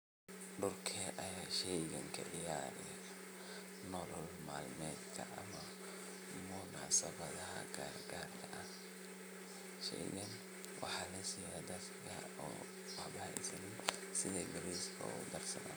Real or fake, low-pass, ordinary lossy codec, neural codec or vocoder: real; none; none; none